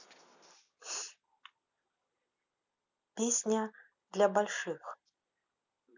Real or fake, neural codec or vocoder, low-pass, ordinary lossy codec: real; none; 7.2 kHz; none